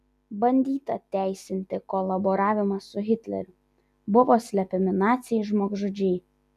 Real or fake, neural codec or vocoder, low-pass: real; none; 14.4 kHz